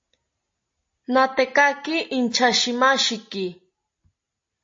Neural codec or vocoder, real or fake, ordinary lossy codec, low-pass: none; real; MP3, 32 kbps; 7.2 kHz